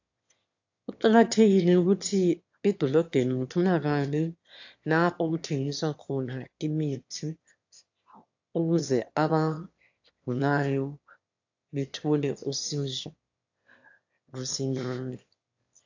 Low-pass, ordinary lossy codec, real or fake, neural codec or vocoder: 7.2 kHz; AAC, 48 kbps; fake; autoencoder, 22.05 kHz, a latent of 192 numbers a frame, VITS, trained on one speaker